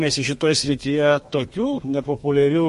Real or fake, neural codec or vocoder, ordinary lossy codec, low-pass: fake; codec, 32 kHz, 1.9 kbps, SNAC; MP3, 48 kbps; 14.4 kHz